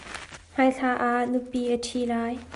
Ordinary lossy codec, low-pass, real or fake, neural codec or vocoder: Opus, 64 kbps; 10.8 kHz; real; none